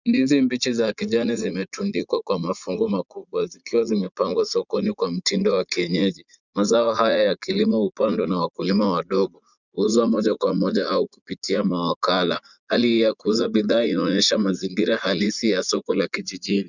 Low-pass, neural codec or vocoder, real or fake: 7.2 kHz; vocoder, 44.1 kHz, 80 mel bands, Vocos; fake